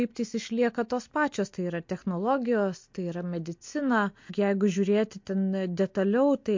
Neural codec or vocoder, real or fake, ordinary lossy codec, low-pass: none; real; MP3, 48 kbps; 7.2 kHz